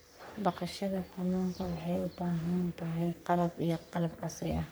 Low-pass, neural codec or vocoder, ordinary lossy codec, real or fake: none; codec, 44.1 kHz, 3.4 kbps, Pupu-Codec; none; fake